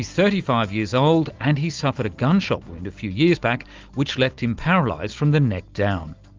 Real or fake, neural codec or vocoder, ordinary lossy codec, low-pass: real; none; Opus, 32 kbps; 7.2 kHz